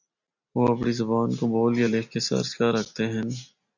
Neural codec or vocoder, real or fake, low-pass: none; real; 7.2 kHz